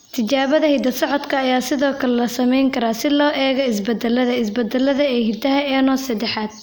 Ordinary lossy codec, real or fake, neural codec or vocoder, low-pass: none; real; none; none